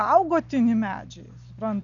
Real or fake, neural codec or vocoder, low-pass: real; none; 7.2 kHz